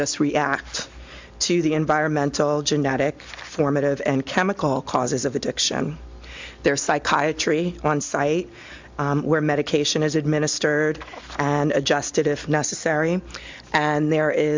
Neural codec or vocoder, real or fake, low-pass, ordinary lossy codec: none; real; 7.2 kHz; MP3, 64 kbps